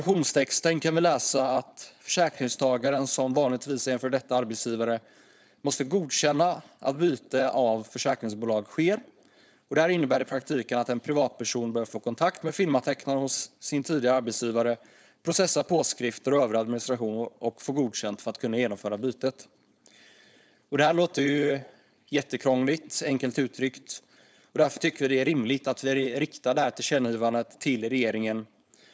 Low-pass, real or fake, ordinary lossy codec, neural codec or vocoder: none; fake; none; codec, 16 kHz, 4.8 kbps, FACodec